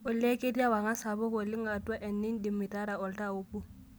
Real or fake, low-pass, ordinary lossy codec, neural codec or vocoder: real; none; none; none